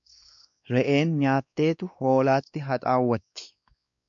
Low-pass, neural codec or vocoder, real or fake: 7.2 kHz; codec, 16 kHz, 2 kbps, X-Codec, WavLM features, trained on Multilingual LibriSpeech; fake